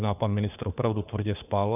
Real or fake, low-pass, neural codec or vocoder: fake; 3.6 kHz; codec, 16 kHz, 4 kbps, FunCodec, trained on LibriTTS, 50 frames a second